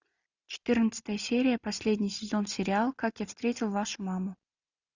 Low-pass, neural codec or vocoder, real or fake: 7.2 kHz; none; real